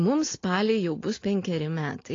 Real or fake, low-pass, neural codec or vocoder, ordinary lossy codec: real; 7.2 kHz; none; AAC, 32 kbps